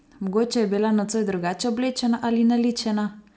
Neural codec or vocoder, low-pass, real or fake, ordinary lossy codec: none; none; real; none